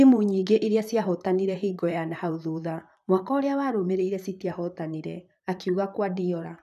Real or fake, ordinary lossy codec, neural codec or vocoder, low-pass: fake; none; vocoder, 44.1 kHz, 128 mel bands, Pupu-Vocoder; 14.4 kHz